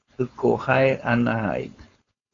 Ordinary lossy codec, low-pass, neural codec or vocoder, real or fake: AAC, 32 kbps; 7.2 kHz; codec, 16 kHz, 4.8 kbps, FACodec; fake